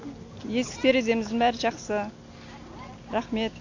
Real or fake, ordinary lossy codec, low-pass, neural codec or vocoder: real; AAC, 48 kbps; 7.2 kHz; none